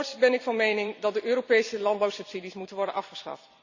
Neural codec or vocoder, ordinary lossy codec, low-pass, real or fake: vocoder, 44.1 kHz, 128 mel bands every 256 samples, BigVGAN v2; Opus, 64 kbps; 7.2 kHz; fake